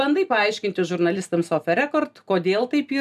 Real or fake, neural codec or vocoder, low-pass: real; none; 14.4 kHz